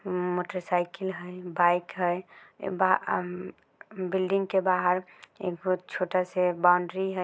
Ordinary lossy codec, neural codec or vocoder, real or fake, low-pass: none; none; real; none